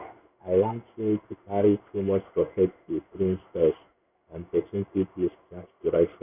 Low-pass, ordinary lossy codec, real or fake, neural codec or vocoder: 3.6 kHz; none; fake; codec, 16 kHz in and 24 kHz out, 1 kbps, XY-Tokenizer